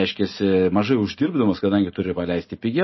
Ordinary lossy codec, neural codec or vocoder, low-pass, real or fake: MP3, 24 kbps; none; 7.2 kHz; real